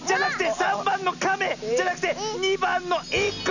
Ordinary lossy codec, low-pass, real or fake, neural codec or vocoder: none; 7.2 kHz; real; none